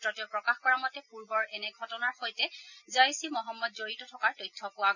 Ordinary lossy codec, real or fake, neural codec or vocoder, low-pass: none; real; none; 7.2 kHz